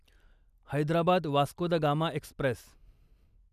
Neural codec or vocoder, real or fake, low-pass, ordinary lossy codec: none; real; 14.4 kHz; none